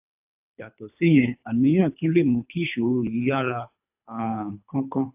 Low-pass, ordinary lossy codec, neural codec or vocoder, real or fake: 3.6 kHz; AAC, 32 kbps; codec, 24 kHz, 3 kbps, HILCodec; fake